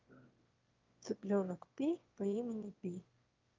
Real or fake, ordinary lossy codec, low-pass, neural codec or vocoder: fake; Opus, 24 kbps; 7.2 kHz; autoencoder, 22.05 kHz, a latent of 192 numbers a frame, VITS, trained on one speaker